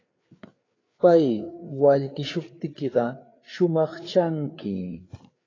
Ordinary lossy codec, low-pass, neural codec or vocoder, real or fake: AAC, 32 kbps; 7.2 kHz; codec, 16 kHz, 4 kbps, FreqCodec, larger model; fake